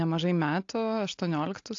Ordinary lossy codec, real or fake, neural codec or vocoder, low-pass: AAC, 48 kbps; real; none; 7.2 kHz